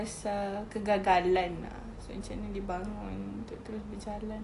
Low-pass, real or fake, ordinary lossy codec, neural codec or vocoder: 10.8 kHz; real; AAC, 48 kbps; none